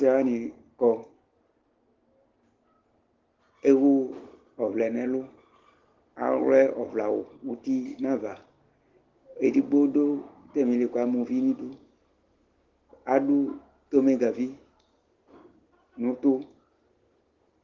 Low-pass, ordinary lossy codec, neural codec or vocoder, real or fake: 7.2 kHz; Opus, 16 kbps; none; real